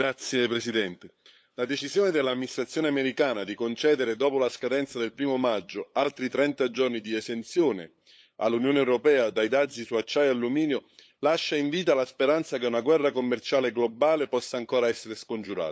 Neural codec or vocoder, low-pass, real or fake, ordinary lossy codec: codec, 16 kHz, 8 kbps, FunCodec, trained on LibriTTS, 25 frames a second; none; fake; none